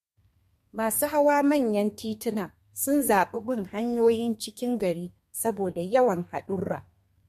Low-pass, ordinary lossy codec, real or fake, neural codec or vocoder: 14.4 kHz; MP3, 64 kbps; fake; codec, 32 kHz, 1.9 kbps, SNAC